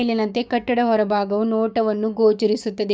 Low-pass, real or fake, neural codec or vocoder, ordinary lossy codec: none; fake; codec, 16 kHz, 6 kbps, DAC; none